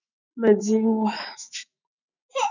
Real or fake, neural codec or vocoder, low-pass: fake; autoencoder, 48 kHz, 128 numbers a frame, DAC-VAE, trained on Japanese speech; 7.2 kHz